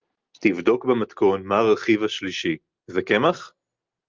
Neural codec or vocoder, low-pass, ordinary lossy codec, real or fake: none; 7.2 kHz; Opus, 32 kbps; real